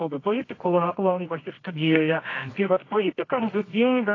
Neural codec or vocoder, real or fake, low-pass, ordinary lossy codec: codec, 24 kHz, 0.9 kbps, WavTokenizer, medium music audio release; fake; 7.2 kHz; AAC, 32 kbps